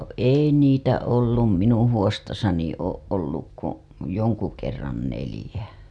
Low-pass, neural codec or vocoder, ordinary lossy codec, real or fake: none; none; none; real